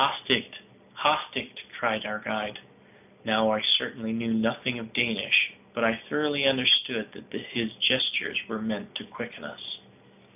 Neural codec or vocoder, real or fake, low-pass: none; real; 3.6 kHz